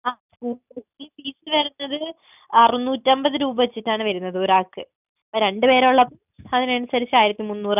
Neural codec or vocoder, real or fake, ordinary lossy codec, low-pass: none; real; none; 3.6 kHz